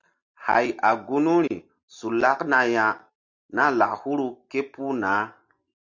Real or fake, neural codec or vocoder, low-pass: real; none; 7.2 kHz